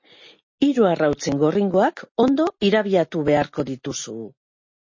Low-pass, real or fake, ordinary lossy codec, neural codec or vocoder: 7.2 kHz; real; MP3, 32 kbps; none